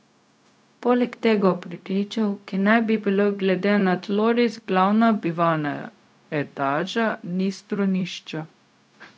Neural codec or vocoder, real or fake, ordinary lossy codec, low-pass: codec, 16 kHz, 0.4 kbps, LongCat-Audio-Codec; fake; none; none